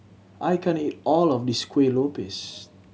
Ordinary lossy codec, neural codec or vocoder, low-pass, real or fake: none; none; none; real